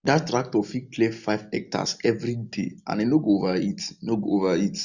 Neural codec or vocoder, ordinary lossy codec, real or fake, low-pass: none; none; real; 7.2 kHz